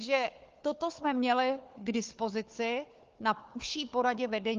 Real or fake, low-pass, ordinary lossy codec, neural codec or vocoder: fake; 7.2 kHz; Opus, 32 kbps; codec, 16 kHz, 4 kbps, FunCodec, trained on Chinese and English, 50 frames a second